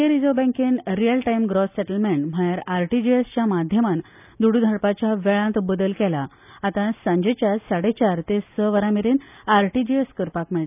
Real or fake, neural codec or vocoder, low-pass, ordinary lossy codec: real; none; 3.6 kHz; none